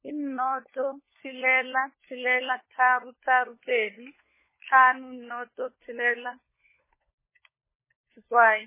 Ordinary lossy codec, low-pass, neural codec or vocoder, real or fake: MP3, 16 kbps; 3.6 kHz; codec, 16 kHz, 4 kbps, FunCodec, trained on LibriTTS, 50 frames a second; fake